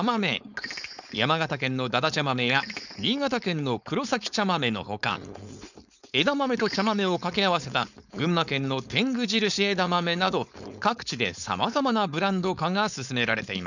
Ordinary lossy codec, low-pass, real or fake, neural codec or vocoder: none; 7.2 kHz; fake; codec, 16 kHz, 4.8 kbps, FACodec